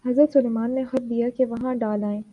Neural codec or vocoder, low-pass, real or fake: none; 10.8 kHz; real